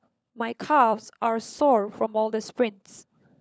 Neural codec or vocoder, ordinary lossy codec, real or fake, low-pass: codec, 16 kHz, 16 kbps, FunCodec, trained on LibriTTS, 50 frames a second; none; fake; none